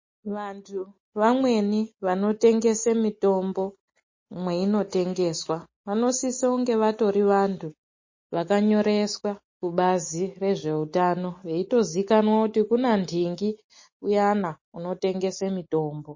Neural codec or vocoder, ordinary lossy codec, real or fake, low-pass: none; MP3, 32 kbps; real; 7.2 kHz